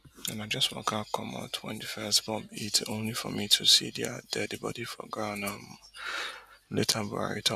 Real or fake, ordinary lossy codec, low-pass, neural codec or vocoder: real; none; 14.4 kHz; none